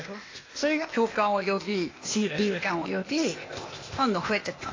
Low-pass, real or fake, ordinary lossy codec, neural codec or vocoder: 7.2 kHz; fake; AAC, 32 kbps; codec, 16 kHz, 0.8 kbps, ZipCodec